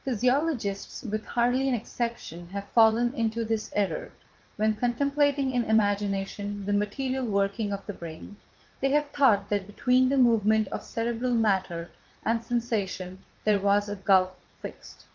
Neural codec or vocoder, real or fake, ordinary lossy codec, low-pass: vocoder, 44.1 kHz, 80 mel bands, Vocos; fake; Opus, 32 kbps; 7.2 kHz